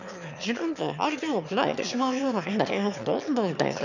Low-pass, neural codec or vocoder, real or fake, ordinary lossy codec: 7.2 kHz; autoencoder, 22.05 kHz, a latent of 192 numbers a frame, VITS, trained on one speaker; fake; none